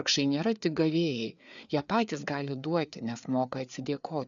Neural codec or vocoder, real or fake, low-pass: codec, 16 kHz, 4 kbps, FreqCodec, larger model; fake; 7.2 kHz